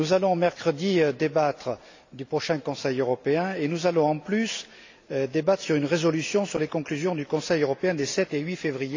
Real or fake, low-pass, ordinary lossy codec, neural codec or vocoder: real; 7.2 kHz; AAC, 48 kbps; none